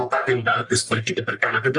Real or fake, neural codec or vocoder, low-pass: fake; codec, 44.1 kHz, 1.7 kbps, Pupu-Codec; 10.8 kHz